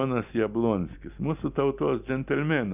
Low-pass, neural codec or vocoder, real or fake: 3.6 kHz; none; real